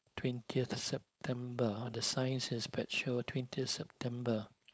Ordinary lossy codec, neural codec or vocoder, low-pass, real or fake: none; codec, 16 kHz, 4.8 kbps, FACodec; none; fake